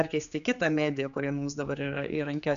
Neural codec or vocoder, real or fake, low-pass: codec, 16 kHz, 4 kbps, X-Codec, HuBERT features, trained on general audio; fake; 7.2 kHz